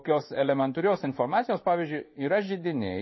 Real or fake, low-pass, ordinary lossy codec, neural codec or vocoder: fake; 7.2 kHz; MP3, 24 kbps; codec, 16 kHz in and 24 kHz out, 1 kbps, XY-Tokenizer